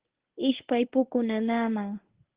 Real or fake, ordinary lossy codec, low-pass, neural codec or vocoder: fake; Opus, 16 kbps; 3.6 kHz; codec, 24 kHz, 0.9 kbps, WavTokenizer, medium speech release version 1